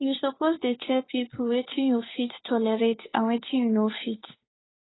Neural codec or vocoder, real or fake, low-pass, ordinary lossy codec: codec, 16 kHz, 2 kbps, FunCodec, trained on Chinese and English, 25 frames a second; fake; 7.2 kHz; AAC, 16 kbps